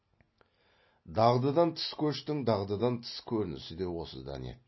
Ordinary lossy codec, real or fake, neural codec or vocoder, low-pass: MP3, 24 kbps; real; none; 7.2 kHz